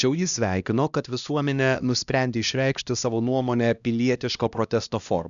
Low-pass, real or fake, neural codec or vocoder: 7.2 kHz; fake; codec, 16 kHz, 1 kbps, X-Codec, HuBERT features, trained on LibriSpeech